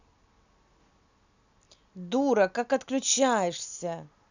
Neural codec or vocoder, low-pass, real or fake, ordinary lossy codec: none; 7.2 kHz; real; none